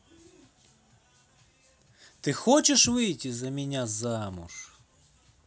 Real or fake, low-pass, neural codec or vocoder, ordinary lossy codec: real; none; none; none